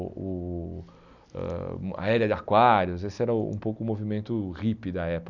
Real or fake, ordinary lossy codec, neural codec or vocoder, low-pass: real; none; none; 7.2 kHz